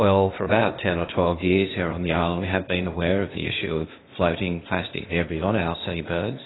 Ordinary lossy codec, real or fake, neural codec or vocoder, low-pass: AAC, 16 kbps; fake; codec, 16 kHz, 0.8 kbps, ZipCodec; 7.2 kHz